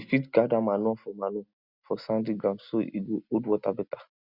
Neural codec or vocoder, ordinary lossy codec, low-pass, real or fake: none; Opus, 64 kbps; 5.4 kHz; real